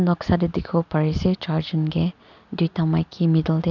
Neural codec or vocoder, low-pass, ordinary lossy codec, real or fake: none; 7.2 kHz; none; real